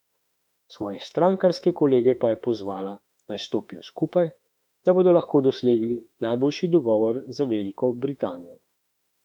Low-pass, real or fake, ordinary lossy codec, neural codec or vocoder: 19.8 kHz; fake; none; autoencoder, 48 kHz, 32 numbers a frame, DAC-VAE, trained on Japanese speech